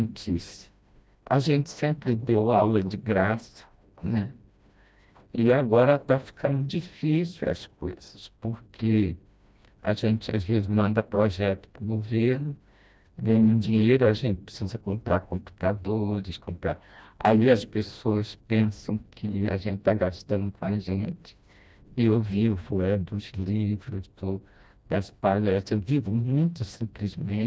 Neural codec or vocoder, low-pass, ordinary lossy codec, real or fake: codec, 16 kHz, 1 kbps, FreqCodec, smaller model; none; none; fake